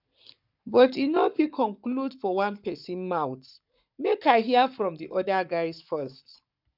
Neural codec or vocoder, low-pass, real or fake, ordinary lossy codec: codec, 44.1 kHz, 7.8 kbps, Pupu-Codec; 5.4 kHz; fake; none